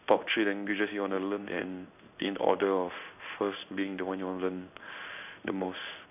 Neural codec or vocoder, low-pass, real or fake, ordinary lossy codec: codec, 16 kHz in and 24 kHz out, 1 kbps, XY-Tokenizer; 3.6 kHz; fake; none